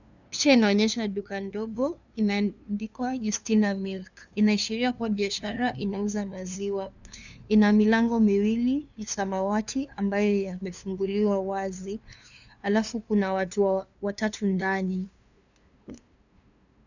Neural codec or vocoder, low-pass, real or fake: codec, 16 kHz, 2 kbps, FunCodec, trained on LibriTTS, 25 frames a second; 7.2 kHz; fake